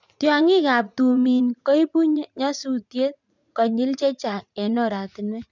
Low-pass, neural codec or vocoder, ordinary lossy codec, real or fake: 7.2 kHz; vocoder, 44.1 kHz, 80 mel bands, Vocos; none; fake